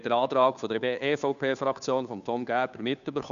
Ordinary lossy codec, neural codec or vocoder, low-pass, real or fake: none; codec, 16 kHz, 2 kbps, FunCodec, trained on Chinese and English, 25 frames a second; 7.2 kHz; fake